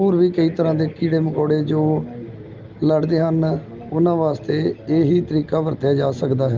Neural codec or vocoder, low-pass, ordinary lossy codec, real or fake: none; 7.2 kHz; Opus, 24 kbps; real